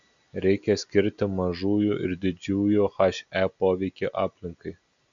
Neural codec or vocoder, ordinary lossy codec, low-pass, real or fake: none; MP3, 96 kbps; 7.2 kHz; real